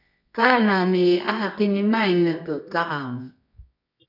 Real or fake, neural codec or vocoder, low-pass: fake; codec, 24 kHz, 0.9 kbps, WavTokenizer, medium music audio release; 5.4 kHz